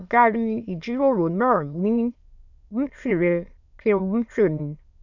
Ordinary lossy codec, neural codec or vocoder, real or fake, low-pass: none; autoencoder, 22.05 kHz, a latent of 192 numbers a frame, VITS, trained on many speakers; fake; 7.2 kHz